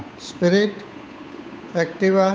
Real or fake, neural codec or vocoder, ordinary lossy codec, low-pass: fake; codec, 16 kHz, 8 kbps, FunCodec, trained on Chinese and English, 25 frames a second; none; none